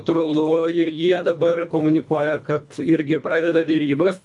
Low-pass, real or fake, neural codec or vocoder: 10.8 kHz; fake; codec, 24 kHz, 1.5 kbps, HILCodec